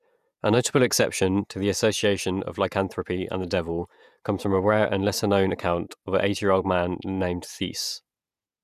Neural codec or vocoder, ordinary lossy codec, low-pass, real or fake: vocoder, 48 kHz, 128 mel bands, Vocos; none; 14.4 kHz; fake